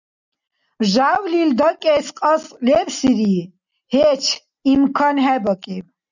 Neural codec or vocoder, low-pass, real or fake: none; 7.2 kHz; real